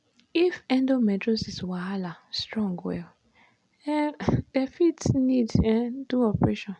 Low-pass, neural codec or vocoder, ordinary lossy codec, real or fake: 10.8 kHz; none; none; real